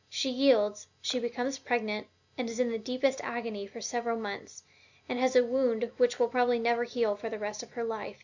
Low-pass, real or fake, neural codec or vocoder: 7.2 kHz; real; none